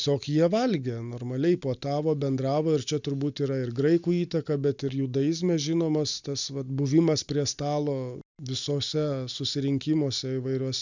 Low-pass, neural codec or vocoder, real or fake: 7.2 kHz; none; real